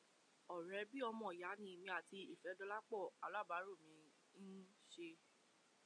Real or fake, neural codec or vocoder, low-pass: real; none; 9.9 kHz